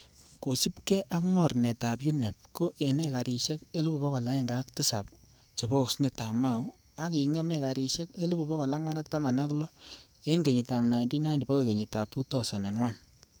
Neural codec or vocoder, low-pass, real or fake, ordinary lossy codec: codec, 44.1 kHz, 2.6 kbps, SNAC; none; fake; none